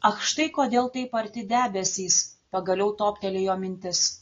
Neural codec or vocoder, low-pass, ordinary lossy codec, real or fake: none; 7.2 kHz; AAC, 32 kbps; real